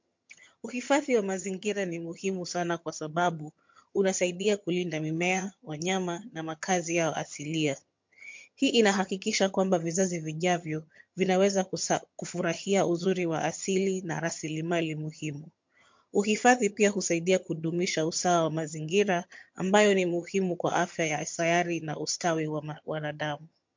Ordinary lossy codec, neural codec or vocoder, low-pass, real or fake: MP3, 48 kbps; vocoder, 22.05 kHz, 80 mel bands, HiFi-GAN; 7.2 kHz; fake